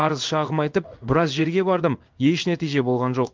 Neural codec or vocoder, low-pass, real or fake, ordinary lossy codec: codec, 16 kHz in and 24 kHz out, 1 kbps, XY-Tokenizer; 7.2 kHz; fake; Opus, 24 kbps